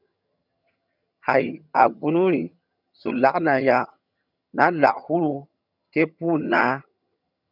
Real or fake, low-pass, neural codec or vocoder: fake; 5.4 kHz; vocoder, 22.05 kHz, 80 mel bands, HiFi-GAN